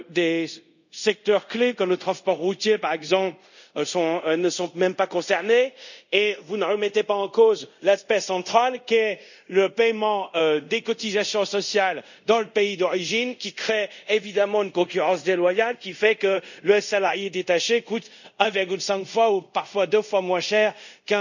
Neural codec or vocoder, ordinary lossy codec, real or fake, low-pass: codec, 24 kHz, 0.5 kbps, DualCodec; none; fake; 7.2 kHz